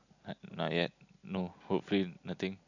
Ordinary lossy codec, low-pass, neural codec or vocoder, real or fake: none; 7.2 kHz; none; real